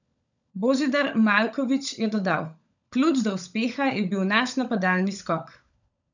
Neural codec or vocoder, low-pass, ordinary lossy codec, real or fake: codec, 16 kHz, 16 kbps, FunCodec, trained on LibriTTS, 50 frames a second; 7.2 kHz; none; fake